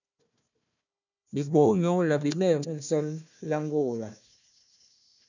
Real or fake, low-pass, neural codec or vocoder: fake; 7.2 kHz; codec, 16 kHz, 1 kbps, FunCodec, trained on Chinese and English, 50 frames a second